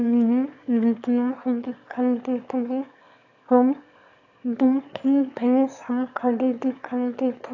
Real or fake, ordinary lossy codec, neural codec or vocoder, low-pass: fake; AAC, 48 kbps; autoencoder, 22.05 kHz, a latent of 192 numbers a frame, VITS, trained on one speaker; 7.2 kHz